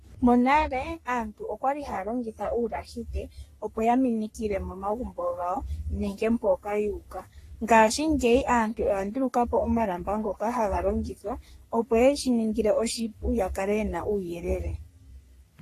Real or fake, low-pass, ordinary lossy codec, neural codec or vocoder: fake; 14.4 kHz; AAC, 48 kbps; codec, 44.1 kHz, 3.4 kbps, Pupu-Codec